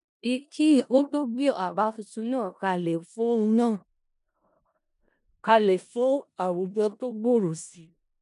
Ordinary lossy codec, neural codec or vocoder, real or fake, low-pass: none; codec, 16 kHz in and 24 kHz out, 0.4 kbps, LongCat-Audio-Codec, four codebook decoder; fake; 10.8 kHz